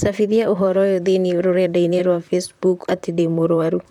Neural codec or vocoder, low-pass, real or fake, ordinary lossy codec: vocoder, 44.1 kHz, 128 mel bands, Pupu-Vocoder; 19.8 kHz; fake; none